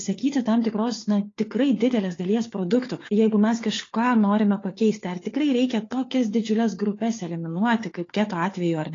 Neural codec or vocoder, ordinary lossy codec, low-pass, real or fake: codec, 16 kHz, 4 kbps, FunCodec, trained on LibriTTS, 50 frames a second; AAC, 32 kbps; 7.2 kHz; fake